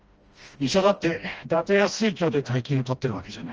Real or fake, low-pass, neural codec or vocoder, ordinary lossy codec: fake; 7.2 kHz; codec, 16 kHz, 1 kbps, FreqCodec, smaller model; Opus, 24 kbps